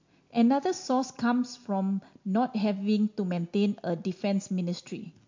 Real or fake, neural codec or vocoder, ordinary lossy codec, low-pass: real; none; MP3, 48 kbps; 7.2 kHz